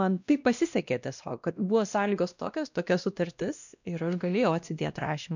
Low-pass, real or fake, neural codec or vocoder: 7.2 kHz; fake; codec, 16 kHz, 1 kbps, X-Codec, WavLM features, trained on Multilingual LibriSpeech